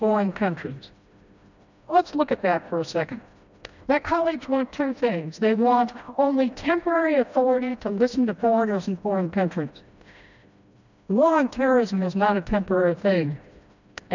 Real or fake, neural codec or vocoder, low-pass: fake; codec, 16 kHz, 1 kbps, FreqCodec, smaller model; 7.2 kHz